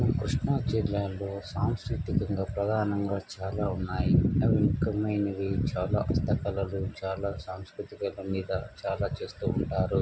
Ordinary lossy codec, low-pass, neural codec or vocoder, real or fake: none; none; none; real